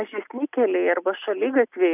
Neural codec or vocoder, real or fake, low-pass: none; real; 3.6 kHz